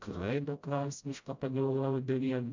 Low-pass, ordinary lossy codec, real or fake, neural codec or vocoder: 7.2 kHz; MP3, 64 kbps; fake; codec, 16 kHz, 0.5 kbps, FreqCodec, smaller model